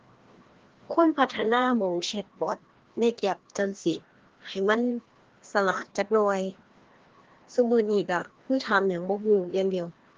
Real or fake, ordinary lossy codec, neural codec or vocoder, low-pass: fake; Opus, 16 kbps; codec, 16 kHz, 1 kbps, FreqCodec, larger model; 7.2 kHz